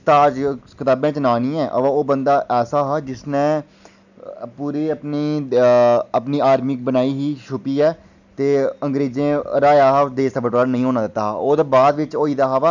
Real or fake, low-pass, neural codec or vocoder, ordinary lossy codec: real; 7.2 kHz; none; none